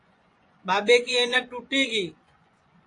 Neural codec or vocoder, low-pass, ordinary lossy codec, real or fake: vocoder, 24 kHz, 100 mel bands, Vocos; 10.8 kHz; AAC, 48 kbps; fake